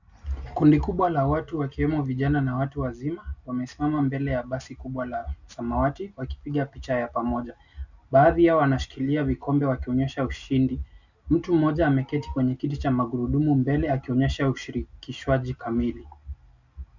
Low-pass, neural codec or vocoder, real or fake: 7.2 kHz; none; real